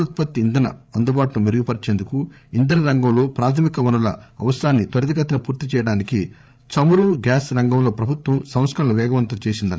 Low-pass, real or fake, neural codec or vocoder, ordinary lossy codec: none; fake; codec, 16 kHz, 8 kbps, FreqCodec, larger model; none